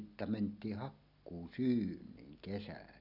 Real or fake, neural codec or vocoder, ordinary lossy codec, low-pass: real; none; none; 5.4 kHz